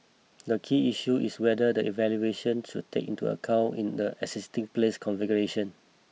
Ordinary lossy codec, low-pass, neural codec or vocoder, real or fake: none; none; none; real